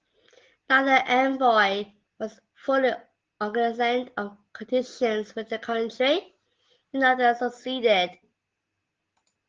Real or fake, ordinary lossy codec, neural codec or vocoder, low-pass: real; Opus, 16 kbps; none; 7.2 kHz